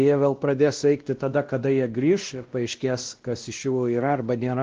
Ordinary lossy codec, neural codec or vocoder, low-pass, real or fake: Opus, 16 kbps; codec, 16 kHz, 1 kbps, X-Codec, WavLM features, trained on Multilingual LibriSpeech; 7.2 kHz; fake